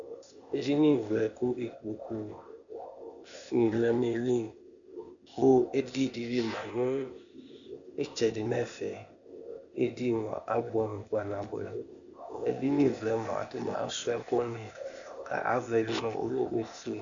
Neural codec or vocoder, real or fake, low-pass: codec, 16 kHz, 0.8 kbps, ZipCodec; fake; 7.2 kHz